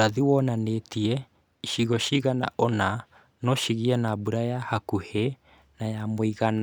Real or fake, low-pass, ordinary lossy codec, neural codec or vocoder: real; none; none; none